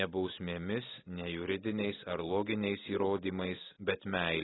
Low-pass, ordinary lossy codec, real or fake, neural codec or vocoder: 7.2 kHz; AAC, 16 kbps; real; none